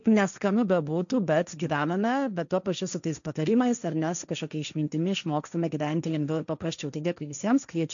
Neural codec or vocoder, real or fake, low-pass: codec, 16 kHz, 1.1 kbps, Voila-Tokenizer; fake; 7.2 kHz